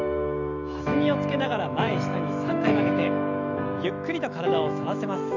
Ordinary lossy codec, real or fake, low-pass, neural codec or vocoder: none; fake; 7.2 kHz; autoencoder, 48 kHz, 128 numbers a frame, DAC-VAE, trained on Japanese speech